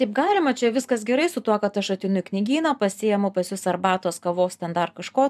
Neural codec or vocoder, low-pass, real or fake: vocoder, 44.1 kHz, 128 mel bands every 512 samples, BigVGAN v2; 14.4 kHz; fake